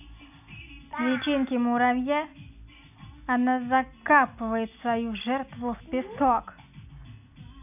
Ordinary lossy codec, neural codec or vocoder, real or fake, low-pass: none; none; real; 3.6 kHz